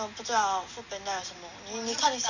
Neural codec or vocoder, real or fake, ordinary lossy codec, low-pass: none; real; none; 7.2 kHz